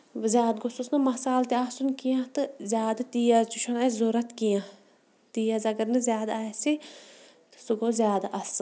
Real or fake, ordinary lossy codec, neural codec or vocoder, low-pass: real; none; none; none